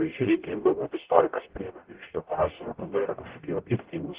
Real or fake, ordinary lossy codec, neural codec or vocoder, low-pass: fake; Opus, 16 kbps; codec, 44.1 kHz, 0.9 kbps, DAC; 3.6 kHz